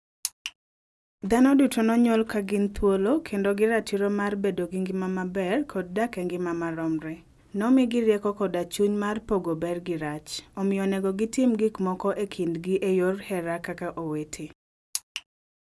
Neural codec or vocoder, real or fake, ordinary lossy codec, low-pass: none; real; none; none